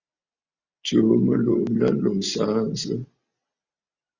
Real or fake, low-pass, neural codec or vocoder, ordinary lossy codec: real; 7.2 kHz; none; Opus, 64 kbps